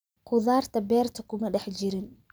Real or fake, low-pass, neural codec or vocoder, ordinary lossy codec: real; none; none; none